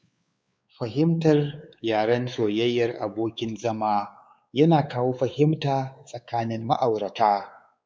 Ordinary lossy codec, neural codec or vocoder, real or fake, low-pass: none; codec, 16 kHz, 4 kbps, X-Codec, WavLM features, trained on Multilingual LibriSpeech; fake; none